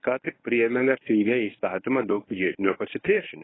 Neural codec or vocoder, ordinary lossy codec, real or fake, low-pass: codec, 24 kHz, 0.9 kbps, WavTokenizer, medium speech release version 1; AAC, 16 kbps; fake; 7.2 kHz